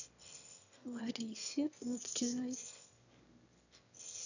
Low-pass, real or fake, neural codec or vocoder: 7.2 kHz; fake; autoencoder, 22.05 kHz, a latent of 192 numbers a frame, VITS, trained on one speaker